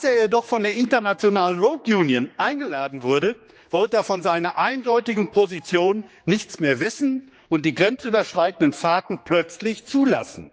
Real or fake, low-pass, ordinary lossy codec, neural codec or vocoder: fake; none; none; codec, 16 kHz, 2 kbps, X-Codec, HuBERT features, trained on general audio